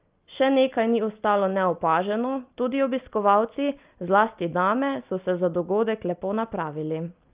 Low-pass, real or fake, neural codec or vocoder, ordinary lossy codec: 3.6 kHz; real; none; Opus, 32 kbps